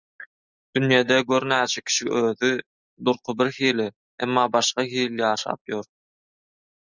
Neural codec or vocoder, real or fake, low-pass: none; real; 7.2 kHz